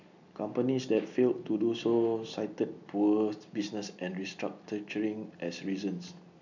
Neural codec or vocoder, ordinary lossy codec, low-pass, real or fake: none; none; 7.2 kHz; real